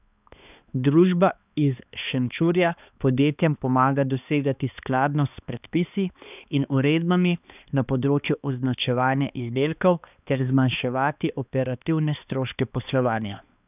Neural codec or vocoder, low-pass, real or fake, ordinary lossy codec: codec, 16 kHz, 2 kbps, X-Codec, HuBERT features, trained on balanced general audio; 3.6 kHz; fake; none